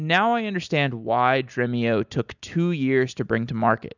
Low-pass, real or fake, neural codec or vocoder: 7.2 kHz; real; none